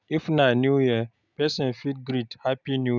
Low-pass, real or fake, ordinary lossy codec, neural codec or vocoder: 7.2 kHz; real; none; none